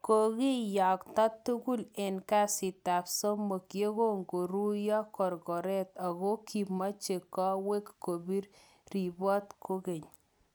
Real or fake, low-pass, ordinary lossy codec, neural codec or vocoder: real; none; none; none